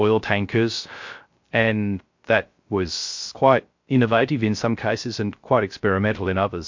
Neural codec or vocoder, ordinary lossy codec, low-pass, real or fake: codec, 16 kHz, 0.3 kbps, FocalCodec; MP3, 48 kbps; 7.2 kHz; fake